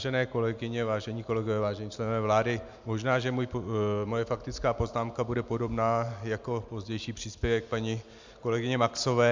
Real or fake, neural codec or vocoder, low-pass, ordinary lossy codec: real; none; 7.2 kHz; MP3, 64 kbps